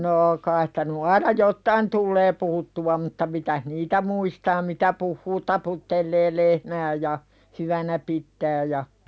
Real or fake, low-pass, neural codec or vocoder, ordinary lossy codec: real; none; none; none